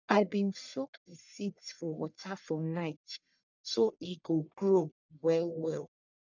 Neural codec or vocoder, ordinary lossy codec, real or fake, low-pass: codec, 44.1 kHz, 1.7 kbps, Pupu-Codec; none; fake; 7.2 kHz